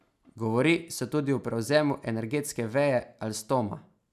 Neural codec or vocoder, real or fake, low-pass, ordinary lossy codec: none; real; 14.4 kHz; none